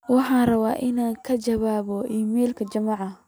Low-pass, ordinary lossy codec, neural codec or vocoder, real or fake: none; none; none; real